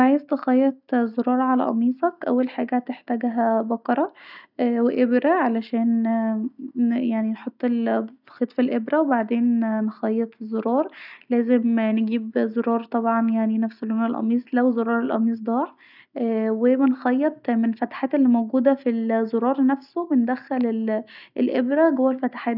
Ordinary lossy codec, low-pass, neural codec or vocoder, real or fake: none; 5.4 kHz; none; real